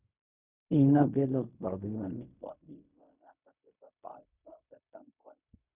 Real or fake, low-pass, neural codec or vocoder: fake; 3.6 kHz; codec, 16 kHz in and 24 kHz out, 0.4 kbps, LongCat-Audio-Codec, fine tuned four codebook decoder